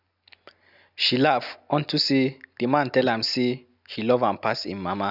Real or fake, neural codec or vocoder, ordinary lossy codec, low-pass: real; none; none; 5.4 kHz